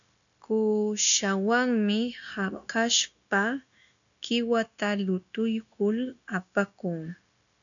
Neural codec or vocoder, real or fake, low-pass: codec, 16 kHz, 0.9 kbps, LongCat-Audio-Codec; fake; 7.2 kHz